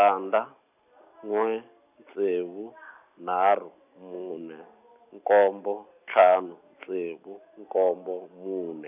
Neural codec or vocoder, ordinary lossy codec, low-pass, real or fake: none; none; 3.6 kHz; real